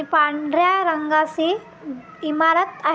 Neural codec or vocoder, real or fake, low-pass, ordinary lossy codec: none; real; none; none